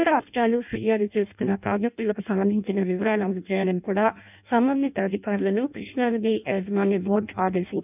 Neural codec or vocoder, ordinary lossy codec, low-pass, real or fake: codec, 16 kHz in and 24 kHz out, 0.6 kbps, FireRedTTS-2 codec; none; 3.6 kHz; fake